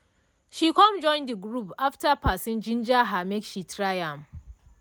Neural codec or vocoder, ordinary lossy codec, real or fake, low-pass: none; none; real; none